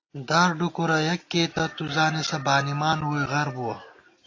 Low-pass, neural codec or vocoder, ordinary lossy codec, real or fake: 7.2 kHz; none; AAC, 32 kbps; real